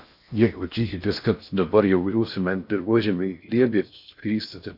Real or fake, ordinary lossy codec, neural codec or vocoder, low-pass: fake; AAC, 48 kbps; codec, 16 kHz in and 24 kHz out, 0.6 kbps, FocalCodec, streaming, 4096 codes; 5.4 kHz